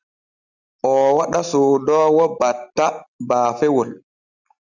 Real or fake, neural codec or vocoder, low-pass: real; none; 7.2 kHz